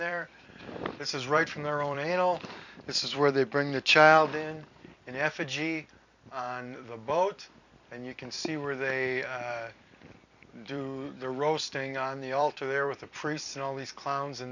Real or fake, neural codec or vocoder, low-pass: fake; vocoder, 44.1 kHz, 128 mel bands every 256 samples, BigVGAN v2; 7.2 kHz